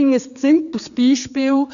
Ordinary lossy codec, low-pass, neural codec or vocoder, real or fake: none; 7.2 kHz; codec, 16 kHz, 4 kbps, X-Codec, HuBERT features, trained on general audio; fake